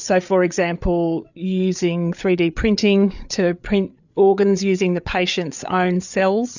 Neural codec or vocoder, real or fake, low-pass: codec, 16 kHz, 8 kbps, FreqCodec, larger model; fake; 7.2 kHz